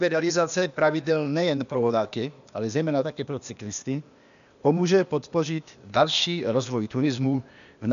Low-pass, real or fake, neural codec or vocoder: 7.2 kHz; fake; codec, 16 kHz, 0.8 kbps, ZipCodec